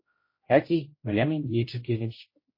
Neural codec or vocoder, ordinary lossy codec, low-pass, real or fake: codec, 16 kHz, 0.5 kbps, X-Codec, HuBERT features, trained on general audio; MP3, 24 kbps; 5.4 kHz; fake